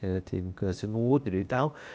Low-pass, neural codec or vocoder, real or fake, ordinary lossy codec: none; codec, 16 kHz, 0.8 kbps, ZipCodec; fake; none